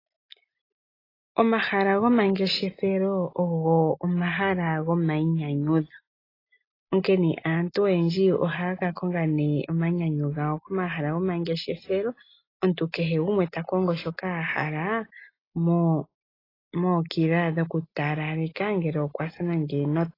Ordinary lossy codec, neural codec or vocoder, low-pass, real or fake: AAC, 24 kbps; none; 5.4 kHz; real